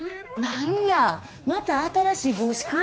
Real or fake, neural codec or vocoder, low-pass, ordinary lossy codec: fake; codec, 16 kHz, 2 kbps, X-Codec, HuBERT features, trained on general audio; none; none